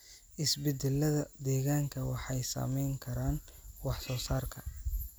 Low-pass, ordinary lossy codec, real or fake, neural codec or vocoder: none; none; real; none